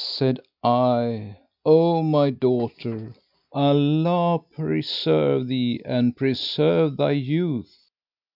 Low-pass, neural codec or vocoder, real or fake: 5.4 kHz; none; real